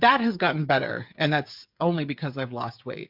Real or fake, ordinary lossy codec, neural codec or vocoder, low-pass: fake; MP3, 48 kbps; codec, 16 kHz, 8 kbps, FreqCodec, smaller model; 5.4 kHz